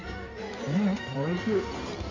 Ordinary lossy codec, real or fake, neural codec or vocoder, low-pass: MP3, 48 kbps; fake; codec, 16 kHz in and 24 kHz out, 2.2 kbps, FireRedTTS-2 codec; 7.2 kHz